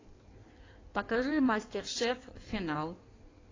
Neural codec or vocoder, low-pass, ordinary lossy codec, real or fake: codec, 16 kHz in and 24 kHz out, 1.1 kbps, FireRedTTS-2 codec; 7.2 kHz; AAC, 32 kbps; fake